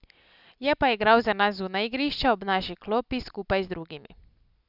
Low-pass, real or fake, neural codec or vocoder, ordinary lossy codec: 5.4 kHz; real; none; none